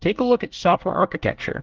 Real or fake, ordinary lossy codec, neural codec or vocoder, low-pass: fake; Opus, 16 kbps; codec, 24 kHz, 1 kbps, SNAC; 7.2 kHz